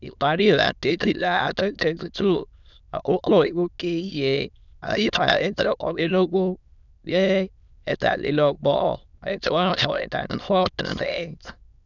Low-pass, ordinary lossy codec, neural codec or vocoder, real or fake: 7.2 kHz; none; autoencoder, 22.05 kHz, a latent of 192 numbers a frame, VITS, trained on many speakers; fake